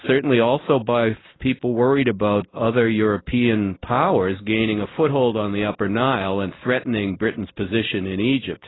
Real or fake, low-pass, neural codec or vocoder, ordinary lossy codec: real; 7.2 kHz; none; AAC, 16 kbps